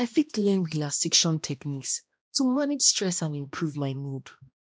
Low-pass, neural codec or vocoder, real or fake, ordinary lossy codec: none; codec, 16 kHz, 1 kbps, X-Codec, HuBERT features, trained on balanced general audio; fake; none